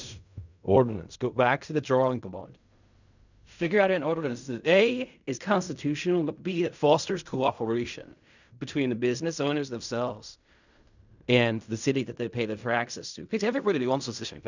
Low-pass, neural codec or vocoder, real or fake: 7.2 kHz; codec, 16 kHz in and 24 kHz out, 0.4 kbps, LongCat-Audio-Codec, fine tuned four codebook decoder; fake